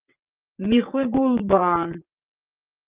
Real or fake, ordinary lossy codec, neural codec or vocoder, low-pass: fake; Opus, 32 kbps; vocoder, 22.05 kHz, 80 mel bands, WaveNeXt; 3.6 kHz